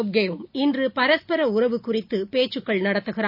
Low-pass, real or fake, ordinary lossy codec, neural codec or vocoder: 5.4 kHz; real; none; none